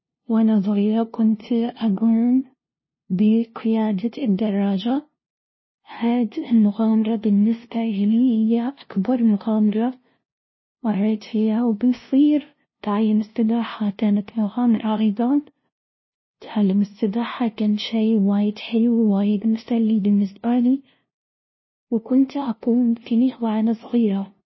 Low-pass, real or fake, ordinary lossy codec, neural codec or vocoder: 7.2 kHz; fake; MP3, 24 kbps; codec, 16 kHz, 0.5 kbps, FunCodec, trained on LibriTTS, 25 frames a second